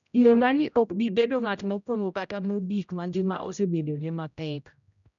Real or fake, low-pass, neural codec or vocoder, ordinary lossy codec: fake; 7.2 kHz; codec, 16 kHz, 0.5 kbps, X-Codec, HuBERT features, trained on general audio; none